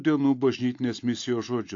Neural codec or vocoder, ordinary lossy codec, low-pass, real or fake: none; AAC, 48 kbps; 7.2 kHz; real